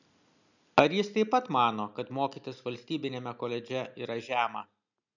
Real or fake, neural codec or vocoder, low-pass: real; none; 7.2 kHz